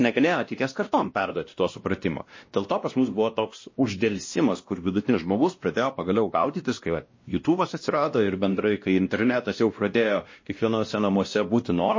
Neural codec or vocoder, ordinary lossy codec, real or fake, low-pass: codec, 16 kHz, 1 kbps, X-Codec, WavLM features, trained on Multilingual LibriSpeech; MP3, 32 kbps; fake; 7.2 kHz